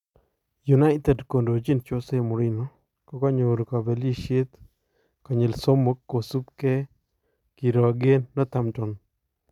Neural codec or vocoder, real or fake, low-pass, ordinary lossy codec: none; real; 19.8 kHz; none